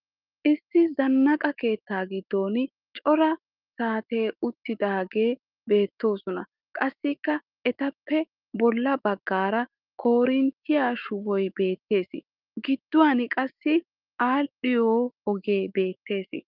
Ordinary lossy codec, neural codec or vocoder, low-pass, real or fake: Opus, 24 kbps; autoencoder, 48 kHz, 128 numbers a frame, DAC-VAE, trained on Japanese speech; 5.4 kHz; fake